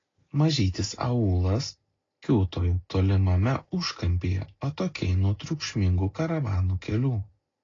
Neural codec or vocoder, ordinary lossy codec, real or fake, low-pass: none; AAC, 32 kbps; real; 7.2 kHz